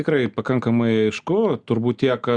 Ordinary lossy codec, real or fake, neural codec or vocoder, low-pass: Opus, 64 kbps; real; none; 9.9 kHz